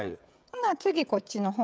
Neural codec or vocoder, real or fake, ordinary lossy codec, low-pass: codec, 16 kHz, 16 kbps, FreqCodec, smaller model; fake; none; none